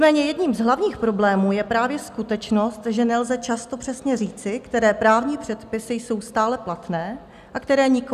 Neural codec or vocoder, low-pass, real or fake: none; 14.4 kHz; real